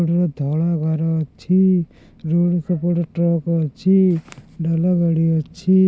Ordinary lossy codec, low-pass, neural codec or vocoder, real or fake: none; none; none; real